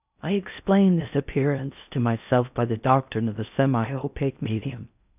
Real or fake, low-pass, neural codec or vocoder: fake; 3.6 kHz; codec, 16 kHz in and 24 kHz out, 0.6 kbps, FocalCodec, streaming, 4096 codes